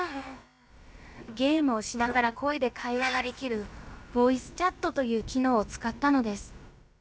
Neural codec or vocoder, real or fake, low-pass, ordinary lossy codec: codec, 16 kHz, about 1 kbps, DyCAST, with the encoder's durations; fake; none; none